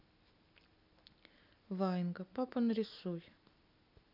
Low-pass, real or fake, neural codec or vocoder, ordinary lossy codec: 5.4 kHz; real; none; none